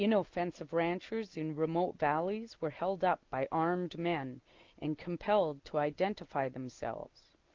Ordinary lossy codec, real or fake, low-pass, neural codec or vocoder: Opus, 24 kbps; real; 7.2 kHz; none